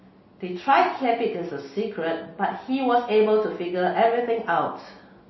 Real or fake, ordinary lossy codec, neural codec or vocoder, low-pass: real; MP3, 24 kbps; none; 7.2 kHz